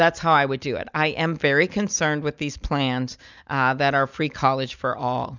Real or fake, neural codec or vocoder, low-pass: real; none; 7.2 kHz